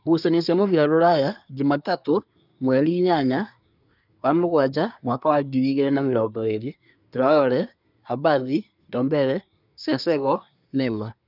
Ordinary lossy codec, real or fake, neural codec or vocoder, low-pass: none; fake; codec, 24 kHz, 1 kbps, SNAC; 5.4 kHz